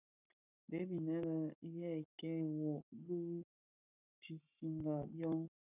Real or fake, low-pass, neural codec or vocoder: fake; 3.6 kHz; codec, 44.1 kHz, 7.8 kbps, Pupu-Codec